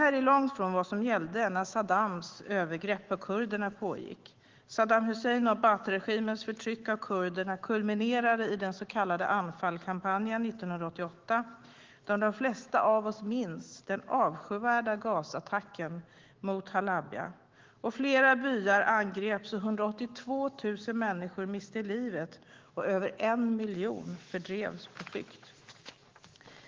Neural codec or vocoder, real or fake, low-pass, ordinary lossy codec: autoencoder, 48 kHz, 128 numbers a frame, DAC-VAE, trained on Japanese speech; fake; 7.2 kHz; Opus, 16 kbps